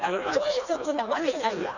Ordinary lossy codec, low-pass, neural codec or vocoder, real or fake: none; 7.2 kHz; codec, 16 kHz, 2 kbps, FreqCodec, smaller model; fake